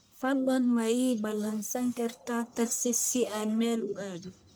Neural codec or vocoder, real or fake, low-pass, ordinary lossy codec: codec, 44.1 kHz, 1.7 kbps, Pupu-Codec; fake; none; none